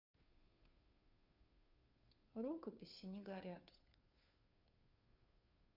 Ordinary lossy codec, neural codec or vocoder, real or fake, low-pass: none; codec, 16 kHz, 2 kbps, FunCodec, trained on Chinese and English, 25 frames a second; fake; 5.4 kHz